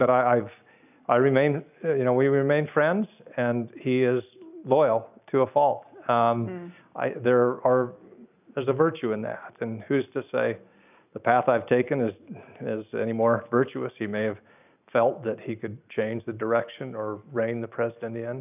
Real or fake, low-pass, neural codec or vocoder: real; 3.6 kHz; none